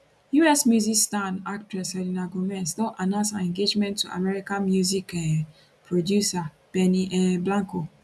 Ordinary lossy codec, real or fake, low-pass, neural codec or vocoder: none; real; none; none